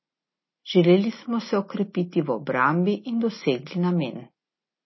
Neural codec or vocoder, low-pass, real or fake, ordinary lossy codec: none; 7.2 kHz; real; MP3, 24 kbps